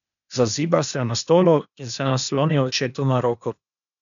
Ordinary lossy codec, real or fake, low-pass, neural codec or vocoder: MP3, 96 kbps; fake; 7.2 kHz; codec, 16 kHz, 0.8 kbps, ZipCodec